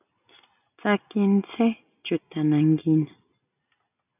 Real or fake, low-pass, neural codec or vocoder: real; 3.6 kHz; none